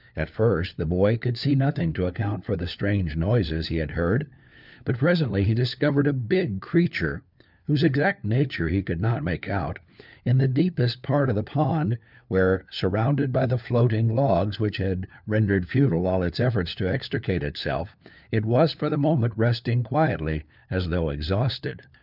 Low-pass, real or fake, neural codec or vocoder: 5.4 kHz; fake; codec, 16 kHz, 4 kbps, FunCodec, trained on LibriTTS, 50 frames a second